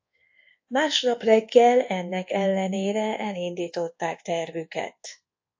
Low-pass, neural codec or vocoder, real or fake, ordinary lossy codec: 7.2 kHz; codec, 24 kHz, 1.2 kbps, DualCodec; fake; AAC, 48 kbps